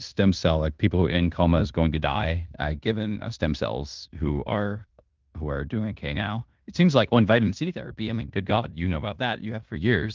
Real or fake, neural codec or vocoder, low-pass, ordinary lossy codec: fake; codec, 16 kHz in and 24 kHz out, 0.9 kbps, LongCat-Audio-Codec, fine tuned four codebook decoder; 7.2 kHz; Opus, 32 kbps